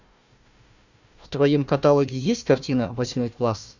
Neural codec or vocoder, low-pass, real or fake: codec, 16 kHz, 1 kbps, FunCodec, trained on Chinese and English, 50 frames a second; 7.2 kHz; fake